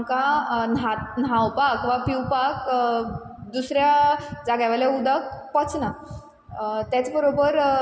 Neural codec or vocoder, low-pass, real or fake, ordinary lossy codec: none; none; real; none